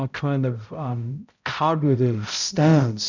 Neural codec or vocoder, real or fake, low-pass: codec, 16 kHz, 0.5 kbps, X-Codec, HuBERT features, trained on balanced general audio; fake; 7.2 kHz